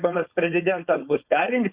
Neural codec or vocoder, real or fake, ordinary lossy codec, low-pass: codec, 16 kHz, 4.8 kbps, FACodec; fake; Opus, 64 kbps; 3.6 kHz